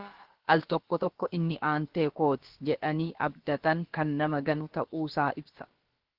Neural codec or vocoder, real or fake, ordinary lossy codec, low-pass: codec, 16 kHz, about 1 kbps, DyCAST, with the encoder's durations; fake; Opus, 16 kbps; 5.4 kHz